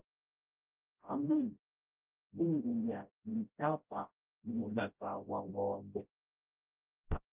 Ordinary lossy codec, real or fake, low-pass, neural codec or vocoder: Opus, 24 kbps; fake; 3.6 kHz; codec, 16 kHz, 0.5 kbps, FreqCodec, smaller model